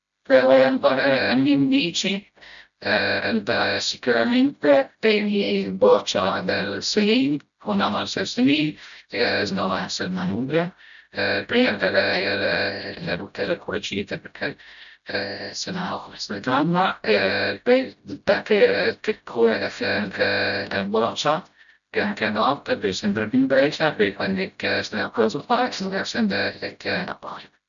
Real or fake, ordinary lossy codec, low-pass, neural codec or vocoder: fake; none; 7.2 kHz; codec, 16 kHz, 0.5 kbps, FreqCodec, smaller model